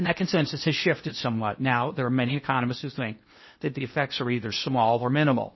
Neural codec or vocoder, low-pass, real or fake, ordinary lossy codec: codec, 16 kHz in and 24 kHz out, 0.8 kbps, FocalCodec, streaming, 65536 codes; 7.2 kHz; fake; MP3, 24 kbps